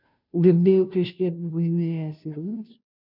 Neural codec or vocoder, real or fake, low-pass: codec, 16 kHz, 0.5 kbps, FunCodec, trained on Chinese and English, 25 frames a second; fake; 5.4 kHz